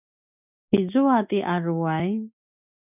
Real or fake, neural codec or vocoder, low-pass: real; none; 3.6 kHz